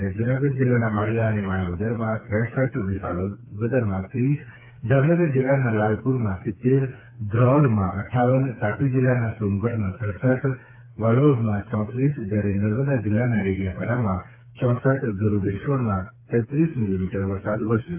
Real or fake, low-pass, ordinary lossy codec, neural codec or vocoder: fake; 3.6 kHz; AAC, 32 kbps; codec, 16 kHz, 4 kbps, FreqCodec, smaller model